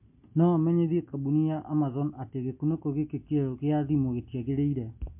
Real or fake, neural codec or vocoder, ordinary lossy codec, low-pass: real; none; MP3, 32 kbps; 3.6 kHz